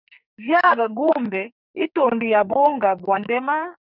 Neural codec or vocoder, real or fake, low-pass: codec, 44.1 kHz, 2.6 kbps, SNAC; fake; 5.4 kHz